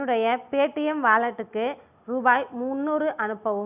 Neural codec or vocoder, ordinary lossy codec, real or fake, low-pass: none; none; real; 3.6 kHz